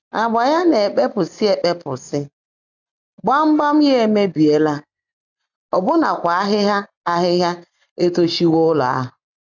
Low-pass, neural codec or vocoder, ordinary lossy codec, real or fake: 7.2 kHz; none; none; real